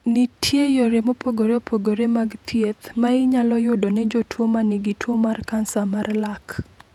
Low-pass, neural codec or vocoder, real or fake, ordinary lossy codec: 19.8 kHz; vocoder, 48 kHz, 128 mel bands, Vocos; fake; none